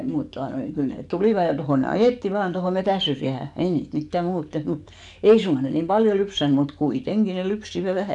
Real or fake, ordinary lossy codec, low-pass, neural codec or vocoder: fake; none; 10.8 kHz; codec, 44.1 kHz, 7.8 kbps, DAC